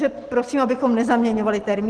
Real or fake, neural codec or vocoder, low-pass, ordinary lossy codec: real; none; 10.8 kHz; Opus, 16 kbps